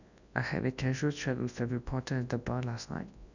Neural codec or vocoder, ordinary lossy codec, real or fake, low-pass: codec, 24 kHz, 0.9 kbps, WavTokenizer, large speech release; none; fake; 7.2 kHz